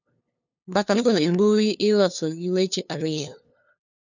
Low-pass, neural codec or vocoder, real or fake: 7.2 kHz; codec, 16 kHz, 2 kbps, FunCodec, trained on LibriTTS, 25 frames a second; fake